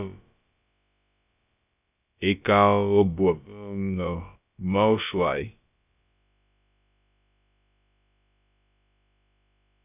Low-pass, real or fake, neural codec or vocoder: 3.6 kHz; fake; codec, 16 kHz, about 1 kbps, DyCAST, with the encoder's durations